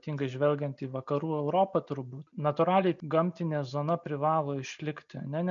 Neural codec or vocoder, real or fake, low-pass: none; real; 7.2 kHz